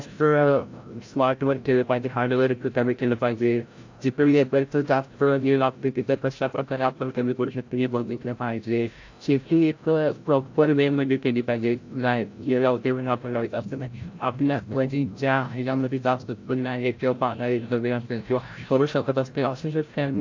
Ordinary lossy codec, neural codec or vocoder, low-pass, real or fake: AAC, 48 kbps; codec, 16 kHz, 0.5 kbps, FreqCodec, larger model; 7.2 kHz; fake